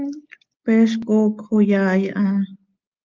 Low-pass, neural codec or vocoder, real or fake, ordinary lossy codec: 7.2 kHz; none; real; Opus, 32 kbps